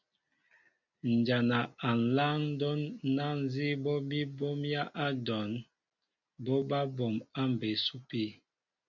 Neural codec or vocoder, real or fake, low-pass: none; real; 7.2 kHz